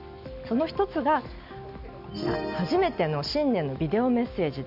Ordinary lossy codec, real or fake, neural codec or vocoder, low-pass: none; real; none; 5.4 kHz